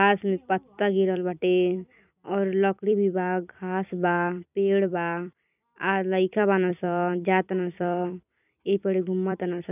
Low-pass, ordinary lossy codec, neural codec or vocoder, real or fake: 3.6 kHz; none; vocoder, 44.1 kHz, 128 mel bands every 256 samples, BigVGAN v2; fake